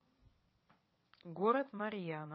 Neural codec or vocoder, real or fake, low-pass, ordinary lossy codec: vocoder, 22.05 kHz, 80 mel bands, WaveNeXt; fake; 7.2 kHz; MP3, 24 kbps